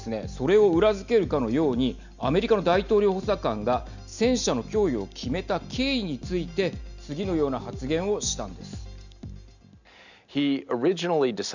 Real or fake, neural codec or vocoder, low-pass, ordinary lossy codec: real; none; 7.2 kHz; none